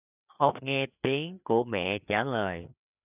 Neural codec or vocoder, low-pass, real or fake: codec, 16 kHz, 4 kbps, FreqCodec, larger model; 3.6 kHz; fake